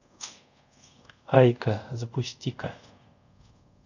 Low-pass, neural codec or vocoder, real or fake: 7.2 kHz; codec, 24 kHz, 0.5 kbps, DualCodec; fake